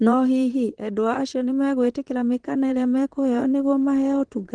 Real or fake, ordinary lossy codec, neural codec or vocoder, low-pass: fake; Opus, 16 kbps; vocoder, 44.1 kHz, 128 mel bands, Pupu-Vocoder; 9.9 kHz